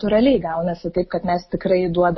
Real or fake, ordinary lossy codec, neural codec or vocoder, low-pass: real; MP3, 24 kbps; none; 7.2 kHz